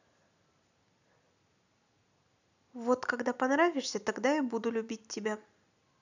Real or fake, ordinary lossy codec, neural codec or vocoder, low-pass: real; none; none; 7.2 kHz